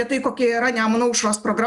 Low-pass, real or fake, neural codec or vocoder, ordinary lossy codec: 10.8 kHz; real; none; Opus, 64 kbps